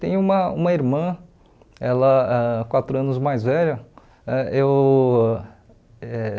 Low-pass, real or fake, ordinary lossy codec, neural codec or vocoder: none; real; none; none